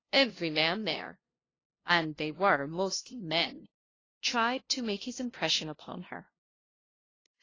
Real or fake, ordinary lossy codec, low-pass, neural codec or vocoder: fake; AAC, 32 kbps; 7.2 kHz; codec, 16 kHz, 0.5 kbps, FunCodec, trained on LibriTTS, 25 frames a second